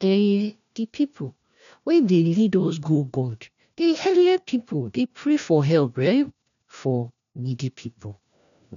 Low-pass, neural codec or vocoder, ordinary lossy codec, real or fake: 7.2 kHz; codec, 16 kHz, 1 kbps, FunCodec, trained on LibriTTS, 50 frames a second; none; fake